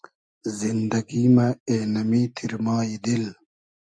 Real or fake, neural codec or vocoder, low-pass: real; none; 9.9 kHz